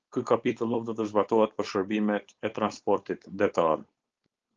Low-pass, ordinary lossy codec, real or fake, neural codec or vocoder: 7.2 kHz; Opus, 24 kbps; fake; codec, 16 kHz, 4.8 kbps, FACodec